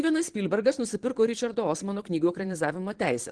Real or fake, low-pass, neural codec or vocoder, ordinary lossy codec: fake; 9.9 kHz; vocoder, 22.05 kHz, 80 mel bands, Vocos; Opus, 16 kbps